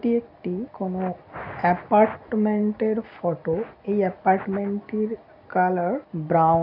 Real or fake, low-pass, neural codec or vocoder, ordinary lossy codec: real; 5.4 kHz; none; AAC, 48 kbps